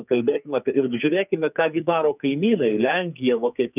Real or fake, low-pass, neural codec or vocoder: fake; 3.6 kHz; codec, 44.1 kHz, 2.6 kbps, SNAC